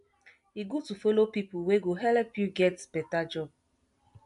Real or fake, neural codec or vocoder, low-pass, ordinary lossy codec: real; none; 10.8 kHz; AAC, 96 kbps